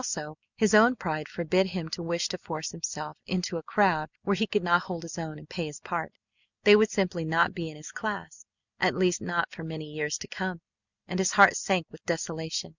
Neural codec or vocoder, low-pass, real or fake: none; 7.2 kHz; real